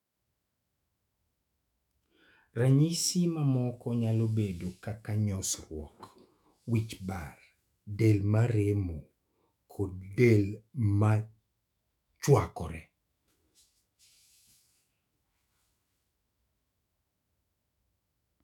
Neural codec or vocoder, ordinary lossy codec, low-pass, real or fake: autoencoder, 48 kHz, 128 numbers a frame, DAC-VAE, trained on Japanese speech; none; 19.8 kHz; fake